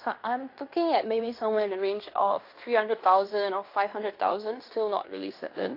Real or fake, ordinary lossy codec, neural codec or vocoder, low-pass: fake; AAC, 32 kbps; codec, 16 kHz in and 24 kHz out, 0.9 kbps, LongCat-Audio-Codec, fine tuned four codebook decoder; 5.4 kHz